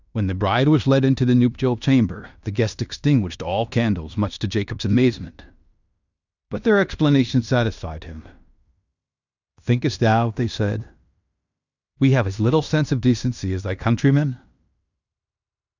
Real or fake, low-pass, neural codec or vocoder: fake; 7.2 kHz; codec, 16 kHz in and 24 kHz out, 0.9 kbps, LongCat-Audio-Codec, fine tuned four codebook decoder